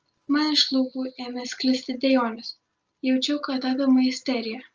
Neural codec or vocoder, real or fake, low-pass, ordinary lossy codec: none; real; 7.2 kHz; Opus, 24 kbps